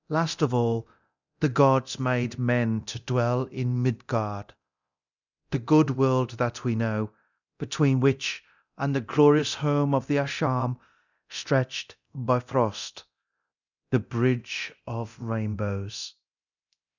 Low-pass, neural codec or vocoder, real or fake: 7.2 kHz; codec, 24 kHz, 0.9 kbps, DualCodec; fake